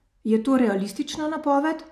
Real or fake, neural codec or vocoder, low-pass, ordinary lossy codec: real; none; 14.4 kHz; none